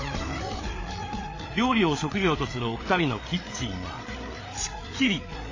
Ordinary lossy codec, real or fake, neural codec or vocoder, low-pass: AAC, 32 kbps; fake; codec, 16 kHz, 8 kbps, FreqCodec, larger model; 7.2 kHz